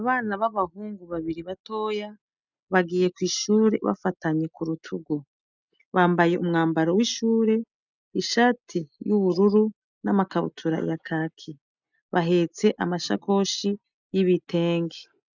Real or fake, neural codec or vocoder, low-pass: real; none; 7.2 kHz